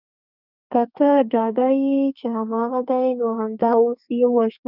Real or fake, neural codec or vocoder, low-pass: fake; codec, 32 kHz, 1.9 kbps, SNAC; 5.4 kHz